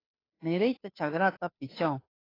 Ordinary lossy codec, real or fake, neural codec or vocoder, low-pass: AAC, 24 kbps; fake; codec, 16 kHz, 2 kbps, FunCodec, trained on Chinese and English, 25 frames a second; 5.4 kHz